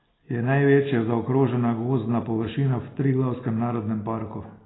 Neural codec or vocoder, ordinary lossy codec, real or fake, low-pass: none; AAC, 16 kbps; real; 7.2 kHz